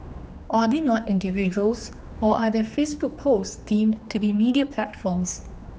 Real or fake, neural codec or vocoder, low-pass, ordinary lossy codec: fake; codec, 16 kHz, 2 kbps, X-Codec, HuBERT features, trained on general audio; none; none